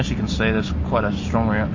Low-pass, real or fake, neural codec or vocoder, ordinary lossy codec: 7.2 kHz; real; none; MP3, 32 kbps